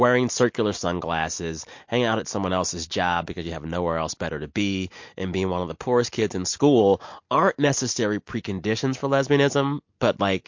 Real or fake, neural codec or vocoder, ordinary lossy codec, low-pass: real; none; MP3, 48 kbps; 7.2 kHz